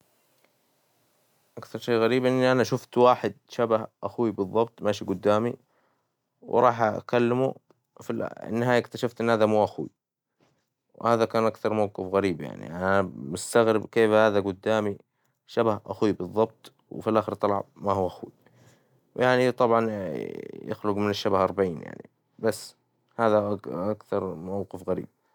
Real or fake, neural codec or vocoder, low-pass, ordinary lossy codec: real; none; 19.8 kHz; none